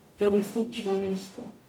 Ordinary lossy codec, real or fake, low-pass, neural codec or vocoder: none; fake; 19.8 kHz; codec, 44.1 kHz, 0.9 kbps, DAC